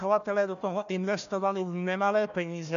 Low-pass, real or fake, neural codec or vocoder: 7.2 kHz; fake; codec, 16 kHz, 1 kbps, FunCodec, trained on Chinese and English, 50 frames a second